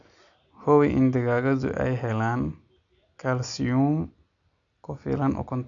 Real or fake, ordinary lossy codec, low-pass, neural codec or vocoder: real; none; 7.2 kHz; none